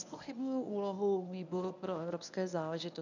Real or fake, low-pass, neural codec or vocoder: fake; 7.2 kHz; codec, 24 kHz, 0.9 kbps, WavTokenizer, medium speech release version 1